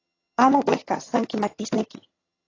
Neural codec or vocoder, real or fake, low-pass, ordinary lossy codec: vocoder, 22.05 kHz, 80 mel bands, HiFi-GAN; fake; 7.2 kHz; AAC, 32 kbps